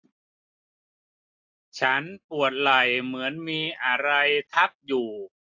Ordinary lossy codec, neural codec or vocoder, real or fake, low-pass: AAC, 48 kbps; none; real; 7.2 kHz